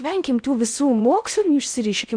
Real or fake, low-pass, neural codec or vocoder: fake; 9.9 kHz; codec, 16 kHz in and 24 kHz out, 0.6 kbps, FocalCodec, streaming, 4096 codes